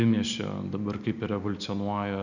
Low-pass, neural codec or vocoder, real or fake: 7.2 kHz; none; real